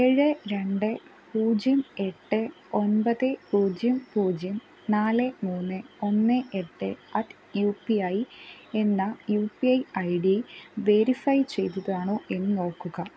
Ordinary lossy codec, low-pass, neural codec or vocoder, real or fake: none; none; none; real